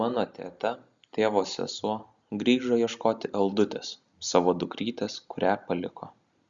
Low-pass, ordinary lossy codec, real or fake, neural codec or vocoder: 7.2 kHz; Opus, 64 kbps; real; none